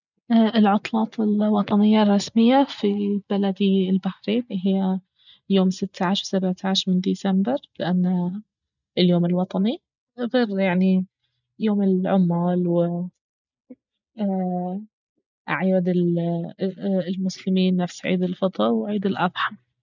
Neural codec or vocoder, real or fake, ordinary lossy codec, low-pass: none; real; none; 7.2 kHz